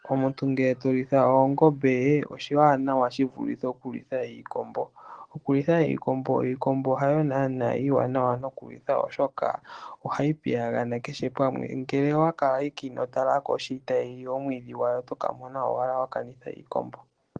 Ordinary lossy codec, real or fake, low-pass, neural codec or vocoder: Opus, 16 kbps; real; 9.9 kHz; none